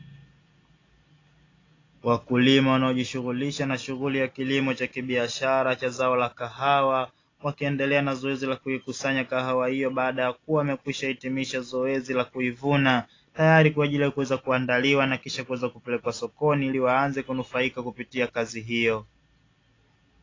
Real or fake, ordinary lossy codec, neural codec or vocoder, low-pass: real; AAC, 32 kbps; none; 7.2 kHz